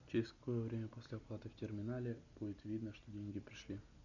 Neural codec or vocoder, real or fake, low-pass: none; real; 7.2 kHz